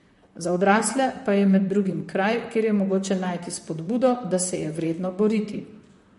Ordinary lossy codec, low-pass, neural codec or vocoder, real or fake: MP3, 48 kbps; 14.4 kHz; vocoder, 44.1 kHz, 128 mel bands, Pupu-Vocoder; fake